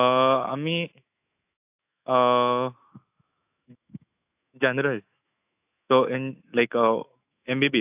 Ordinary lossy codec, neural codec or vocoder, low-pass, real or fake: AAC, 32 kbps; autoencoder, 48 kHz, 128 numbers a frame, DAC-VAE, trained on Japanese speech; 3.6 kHz; fake